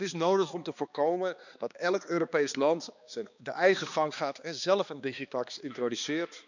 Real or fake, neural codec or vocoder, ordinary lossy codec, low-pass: fake; codec, 16 kHz, 2 kbps, X-Codec, HuBERT features, trained on balanced general audio; none; 7.2 kHz